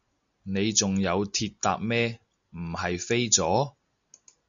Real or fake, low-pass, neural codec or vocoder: real; 7.2 kHz; none